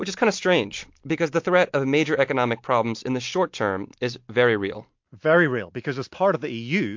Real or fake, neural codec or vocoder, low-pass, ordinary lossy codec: real; none; 7.2 kHz; MP3, 48 kbps